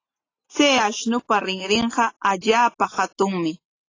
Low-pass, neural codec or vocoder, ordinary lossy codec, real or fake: 7.2 kHz; none; AAC, 32 kbps; real